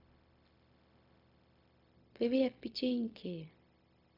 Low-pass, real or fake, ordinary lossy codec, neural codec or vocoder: 5.4 kHz; fake; MP3, 48 kbps; codec, 16 kHz, 0.4 kbps, LongCat-Audio-Codec